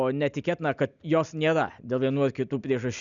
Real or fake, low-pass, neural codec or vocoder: real; 7.2 kHz; none